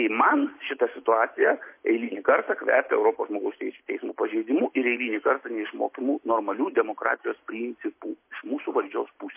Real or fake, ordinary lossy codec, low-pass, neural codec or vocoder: real; MP3, 24 kbps; 3.6 kHz; none